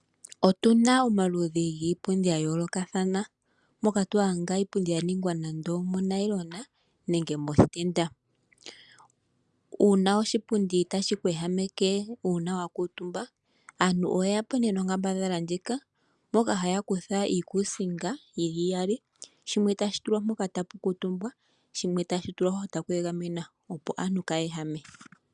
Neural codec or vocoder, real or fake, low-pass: none; real; 10.8 kHz